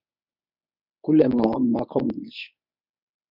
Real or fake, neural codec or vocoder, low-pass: fake; codec, 24 kHz, 0.9 kbps, WavTokenizer, medium speech release version 1; 5.4 kHz